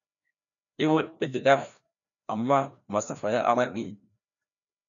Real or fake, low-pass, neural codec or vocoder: fake; 7.2 kHz; codec, 16 kHz, 1 kbps, FreqCodec, larger model